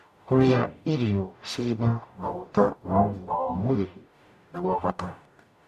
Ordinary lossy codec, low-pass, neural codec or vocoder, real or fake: none; 14.4 kHz; codec, 44.1 kHz, 0.9 kbps, DAC; fake